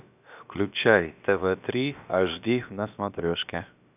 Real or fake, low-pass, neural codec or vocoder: fake; 3.6 kHz; codec, 16 kHz, about 1 kbps, DyCAST, with the encoder's durations